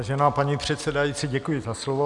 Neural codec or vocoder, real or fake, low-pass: none; real; 10.8 kHz